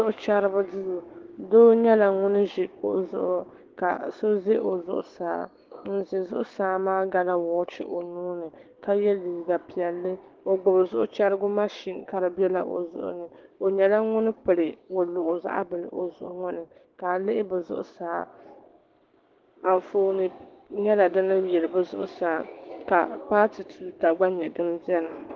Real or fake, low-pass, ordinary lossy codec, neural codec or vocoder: fake; 7.2 kHz; Opus, 16 kbps; codec, 16 kHz, 6 kbps, DAC